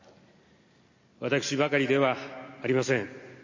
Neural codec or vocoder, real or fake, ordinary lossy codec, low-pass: none; real; MP3, 32 kbps; 7.2 kHz